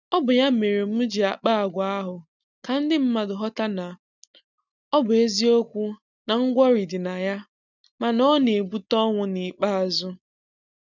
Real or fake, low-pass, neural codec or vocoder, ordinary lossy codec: real; 7.2 kHz; none; none